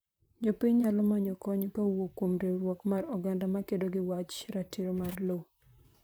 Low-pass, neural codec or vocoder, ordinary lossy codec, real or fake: none; vocoder, 44.1 kHz, 128 mel bands, Pupu-Vocoder; none; fake